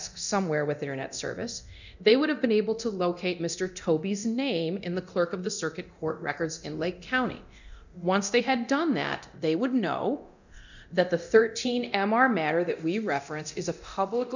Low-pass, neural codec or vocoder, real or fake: 7.2 kHz; codec, 24 kHz, 0.9 kbps, DualCodec; fake